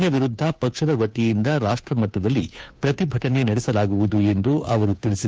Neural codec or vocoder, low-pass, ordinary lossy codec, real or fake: codec, 16 kHz, 2 kbps, FunCodec, trained on Chinese and English, 25 frames a second; none; none; fake